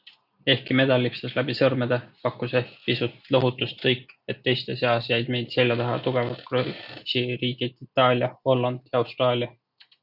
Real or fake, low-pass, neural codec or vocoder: real; 5.4 kHz; none